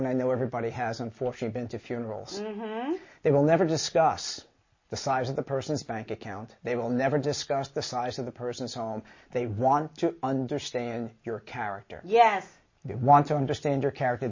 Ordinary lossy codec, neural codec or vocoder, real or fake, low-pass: MP3, 32 kbps; none; real; 7.2 kHz